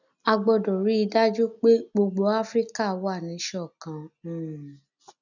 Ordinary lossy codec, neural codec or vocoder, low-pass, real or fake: none; none; 7.2 kHz; real